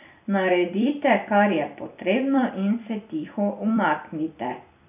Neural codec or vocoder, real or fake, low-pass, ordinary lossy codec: vocoder, 44.1 kHz, 128 mel bands every 256 samples, BigVGAN v2; fake; 3.6 kHz; none